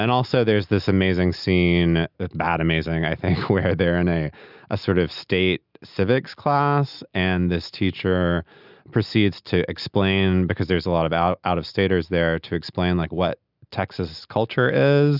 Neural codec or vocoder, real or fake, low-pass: none; real; 5.4 kHz